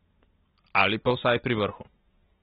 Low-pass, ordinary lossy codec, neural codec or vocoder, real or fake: 19.8 kHz; AAC, 16 kbps; none; real